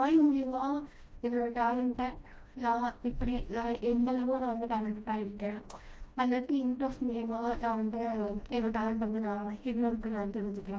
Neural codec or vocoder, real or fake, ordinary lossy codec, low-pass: codec, 16 kHz, 1 kbps, FreqCodec, smaller model; fake; none; none